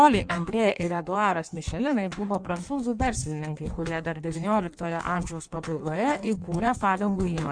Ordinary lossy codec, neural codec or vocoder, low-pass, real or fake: MP3, 96 kbps; codec, 16 kHz in and 24 kHz out, 1.1 kbps, FireRedTTS-2 codec; 9.9 kHz; fake